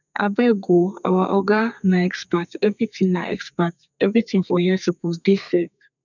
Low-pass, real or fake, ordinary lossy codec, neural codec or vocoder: 7.2 kHz; fake; none; codec, 32 kHz, 1.9 kbps, SNAC